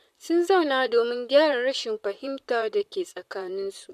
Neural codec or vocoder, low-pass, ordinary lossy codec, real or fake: vocoder, 44.1 kHz, 128 mel bands, Pupu-Vocoder; 14.4 kHz; MP3, 96 kbps; fake